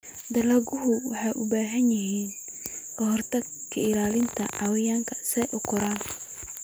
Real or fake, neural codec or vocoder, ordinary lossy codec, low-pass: real; none; none; none